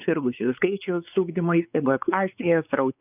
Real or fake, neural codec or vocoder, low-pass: fake; codec, 16 kHz, 4 kbps, X-Codec, WavLM features, trained on Multilingual LibriSpeech; 3.6 kHz